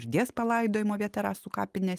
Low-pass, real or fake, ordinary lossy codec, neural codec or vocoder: 14.4 kHz; real; Opus, 32 kbps; none